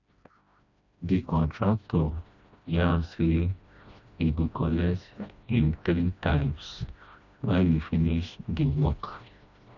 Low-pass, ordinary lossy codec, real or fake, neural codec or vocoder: 7.2 kHz; none; fake; codec, 16 kHz, 1 kbps, FreqCodec, smaller model